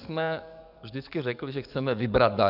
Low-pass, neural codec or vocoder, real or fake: 5.4 kHz; codec, 44.1 kHz, 7.8 kbps, DAC; fake